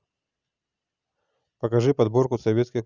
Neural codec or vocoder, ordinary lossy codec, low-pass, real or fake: none; none; 7.2 kHz; real